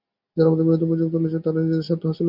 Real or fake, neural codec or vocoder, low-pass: real; none; 5.4 kHz